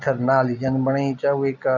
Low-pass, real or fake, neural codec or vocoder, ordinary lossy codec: 7.2 kHz; real; none; none